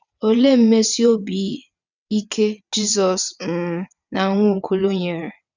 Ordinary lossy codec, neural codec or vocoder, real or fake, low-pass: none; vocoder, 22.05 kHz, 80 mel bands, WaveNeXt; fake; 7.2 kHz